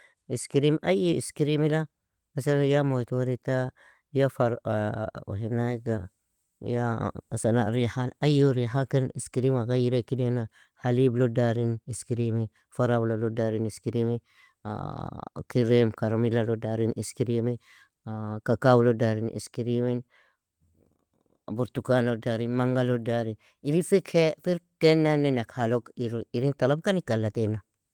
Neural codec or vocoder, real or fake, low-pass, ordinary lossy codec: none; real; 19.8 kHz; Opus, 24 kbps